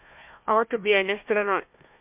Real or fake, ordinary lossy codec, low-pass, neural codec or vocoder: fake; MP3, 32 kbps; 3.6 kHz; codec, 16 kHz, 1 kbps, FunCodec, trained on Chinese and English, 50 frames a second